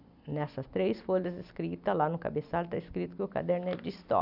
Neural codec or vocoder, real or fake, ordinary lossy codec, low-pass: none; real; none; 5.4 kHz